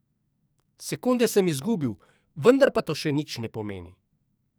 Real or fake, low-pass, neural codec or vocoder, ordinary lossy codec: fake; none; codec, 44.1 kHz, 2.6 kbps, SNAC; none